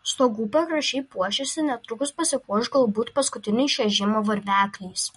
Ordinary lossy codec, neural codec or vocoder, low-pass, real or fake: MP3, 48 kbps; none; 14.4 kHz; real